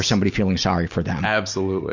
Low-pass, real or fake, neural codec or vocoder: 7.2 kHz; real; none